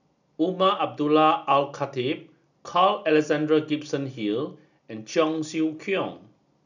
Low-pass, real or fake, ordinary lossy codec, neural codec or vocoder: 7.2 kHz; real; none; none